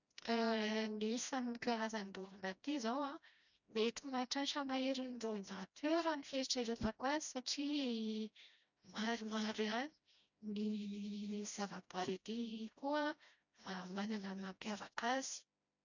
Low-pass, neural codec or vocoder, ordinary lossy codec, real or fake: 7.2 kHz; codec, 16 kHz, 1 kbps, FreqCodec, smaller model; none; fake